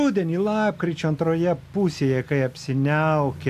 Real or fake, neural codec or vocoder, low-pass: real; none; 14.4 kHz